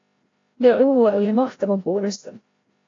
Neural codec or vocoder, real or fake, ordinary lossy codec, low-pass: codec, 16 kHz, 0.5 kbps, FreqCodec, larger model; fake; AAC, 32 kbps; 7.2 kHz